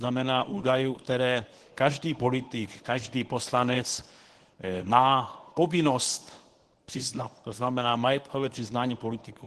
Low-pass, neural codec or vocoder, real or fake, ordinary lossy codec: 10.8 kHz; codec, 24 kHz, 0.9 kbps, WavTokenizer, medium speech release version 1; fake; Opus, 16 kbps